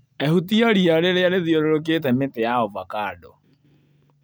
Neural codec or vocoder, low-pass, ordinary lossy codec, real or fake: none; none; none; real